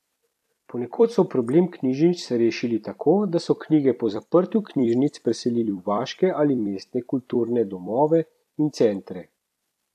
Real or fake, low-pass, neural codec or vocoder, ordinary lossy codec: fake; 14.4 kHz; vocoder, 44.1 kHz, 128 mel bands every 256 samples, BigVGAN v2; none